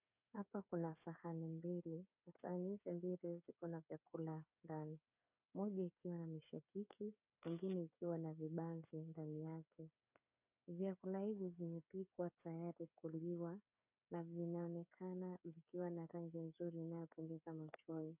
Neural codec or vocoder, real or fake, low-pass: codec, 16 kHz, 8 kbps, FreqCodec, smaller model; fake; 3.6 kHz